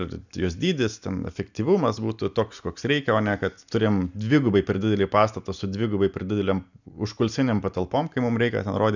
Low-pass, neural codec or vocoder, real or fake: 7.2 kHz; none; real